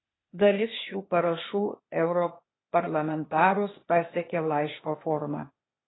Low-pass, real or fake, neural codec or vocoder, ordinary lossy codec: 7.2 kHz; fake; codec, 16 kHz, 0.8 kbps, ZipCodec; AAC, 16 kbps